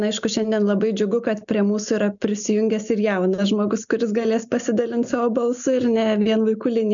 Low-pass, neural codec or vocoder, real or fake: 7.2 kHz; none; real